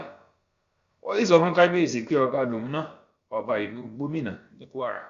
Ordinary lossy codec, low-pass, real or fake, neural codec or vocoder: Opus, 64 kbps; 7.2 kHz; fake; codec, 16 kHz, about 1 kbps, DyCAST, with the encoder's durations